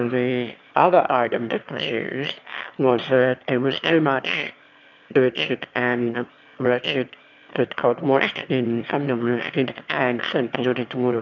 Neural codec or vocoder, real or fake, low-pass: autoencoder, 22.05 kHz, a latent of 192 numbers a frame, VITS, trained on one speaker; fake; 7.2 kHz